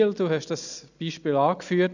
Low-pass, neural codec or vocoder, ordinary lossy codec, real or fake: 7.2 kHz; none; none; real